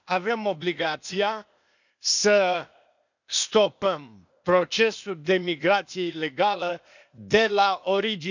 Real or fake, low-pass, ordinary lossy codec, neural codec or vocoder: fake; 7.2 kHz; none; codec, 16 kHz, 0.8 kbps, ZipCodec